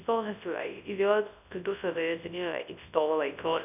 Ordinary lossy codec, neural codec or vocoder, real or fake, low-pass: none; codec, 24 kHz, 0.9 kbps, WavTokenizer, large speech release; fake; 3.6 kHz